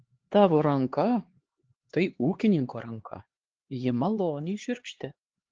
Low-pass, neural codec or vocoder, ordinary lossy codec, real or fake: 7.2 kHz; codec, 16 kHz, 2 kbps, X-Codec, HuBERT features, trained on LibriSpeech; Opus, 16 kbps; fake